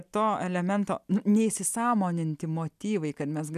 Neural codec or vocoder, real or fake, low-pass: none; real; 14.4 kHz